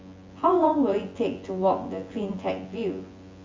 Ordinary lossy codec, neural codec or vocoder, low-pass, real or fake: Opus, 32 kbps; vocoder, 24 kHz, 100 mel bands, Vocos; 7.2 kHz; fake